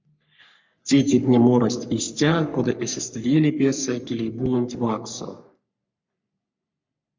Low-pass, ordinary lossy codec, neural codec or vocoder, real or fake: 7.2 kHz; MP3, 64 kbps; codec, 44.1 kHz, 3.4 kbps, Pupu-Codec; fake